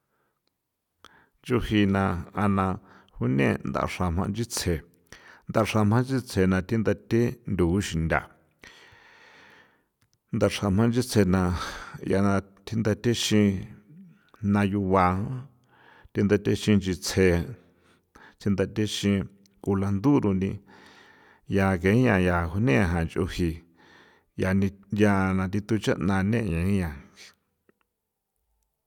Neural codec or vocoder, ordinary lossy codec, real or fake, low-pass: none; none; real; 19.8 kHz